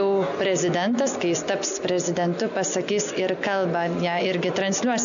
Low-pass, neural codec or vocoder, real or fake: 7.2 kHz; none; real